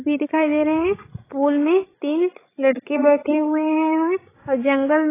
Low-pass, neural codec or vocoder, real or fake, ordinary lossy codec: 3.6 kHz; codec, 16 kHz, 16 kbps, FreqCodec, larger model; fake; AAC, 16 kbps